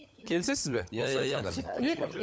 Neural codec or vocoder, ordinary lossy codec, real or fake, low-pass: codec, 16 kHz, 16 kbps, FunCodec, trained on LibriTTS, 50 frames a second; none; fake; none